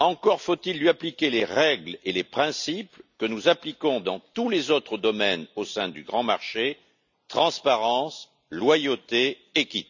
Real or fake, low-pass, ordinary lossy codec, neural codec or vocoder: real; 7.2 kHz; none; none